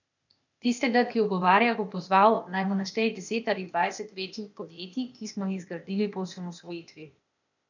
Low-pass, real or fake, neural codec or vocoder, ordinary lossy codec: 7.2 kHz; fake; codec, 16 kHz, 0.8 kbps, ZipCodec; none